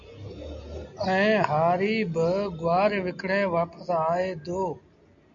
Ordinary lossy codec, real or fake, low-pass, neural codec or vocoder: AAC, 64 kbps; real; 7.2 kHz; none